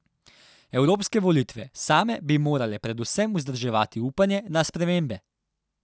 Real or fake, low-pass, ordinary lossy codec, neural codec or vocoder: real; none; none; none